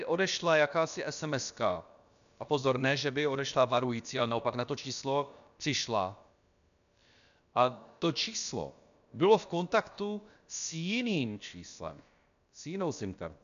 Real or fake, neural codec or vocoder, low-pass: fake; codec, 16 kHz, about 1 kbps, DyCAST, with the encoder's durations; 7.2 kHz